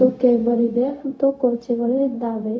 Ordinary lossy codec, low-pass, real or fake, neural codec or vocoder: none; none; fake; codec, 16 kHz, 0.4 kbps, LongCat-Audio-Codec